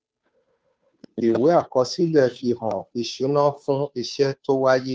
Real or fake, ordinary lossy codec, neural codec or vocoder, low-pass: fake; none; codec, 16 kHz, 2 kbps, FunCodec, trained on Chinese and English, 25 frames a second; none